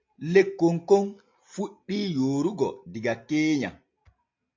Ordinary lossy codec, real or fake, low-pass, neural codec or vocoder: MP3, 48 kbps; real; 7.2 kHz; none